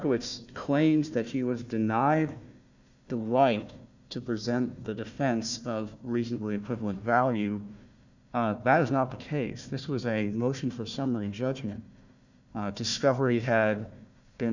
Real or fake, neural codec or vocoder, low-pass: fake; codec, 16 kHz, 1 kbps, FunCodec, trained on Chinese and English, 50 frames a second; 7.2 kHz